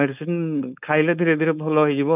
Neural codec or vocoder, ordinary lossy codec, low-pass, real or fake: codec, 16 kHz, 4.8 kbps, FACodec; none; 3.6 kHz; fake